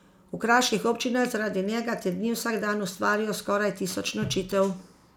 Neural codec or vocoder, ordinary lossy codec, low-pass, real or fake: none; none; none; real